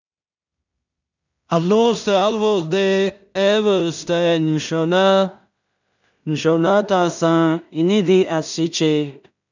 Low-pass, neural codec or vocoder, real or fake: 7.2 kHz; codec, 16 kHz in and 24 kHz out, 0.4 kbps, LongCat-Audio-Codec, two codebook decoder; fake